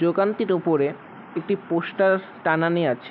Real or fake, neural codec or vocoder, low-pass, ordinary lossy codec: real; none; 5.4 kHz; none